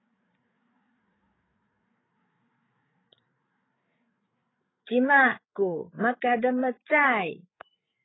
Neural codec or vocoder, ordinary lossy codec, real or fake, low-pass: codec, 16 kHz, 8 kbps, FreqCodec, larger model; AAC, 16 kbps; fake; 7.2 kHz